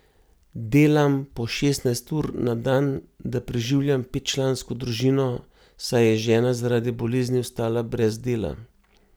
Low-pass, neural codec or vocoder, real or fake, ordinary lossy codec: none; none; real; none